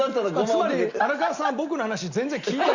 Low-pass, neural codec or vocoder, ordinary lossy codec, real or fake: 7.2 kHz; none; Opus, 64 kbps; real